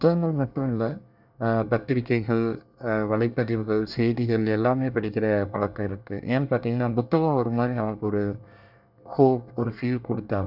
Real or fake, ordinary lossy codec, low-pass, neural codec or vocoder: fake; none; 5.4 kHz; codec, 24 kHz, 1 kbps, SNAC